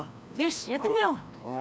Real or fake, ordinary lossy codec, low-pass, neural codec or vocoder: fake; none; none; codec, 16 kHz, 1 kbps, FreqCodec, larger model